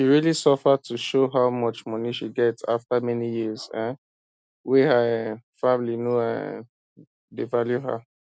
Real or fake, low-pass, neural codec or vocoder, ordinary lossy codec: real; none; none; none